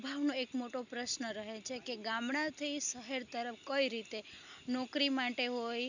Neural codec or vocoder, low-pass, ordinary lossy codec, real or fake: none; 7.2 kHz; none; real